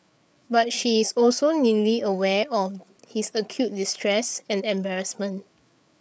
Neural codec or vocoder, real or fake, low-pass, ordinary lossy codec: codec, 16 kHz, 8 kbps, FreqCodec, larger model; fake; none; none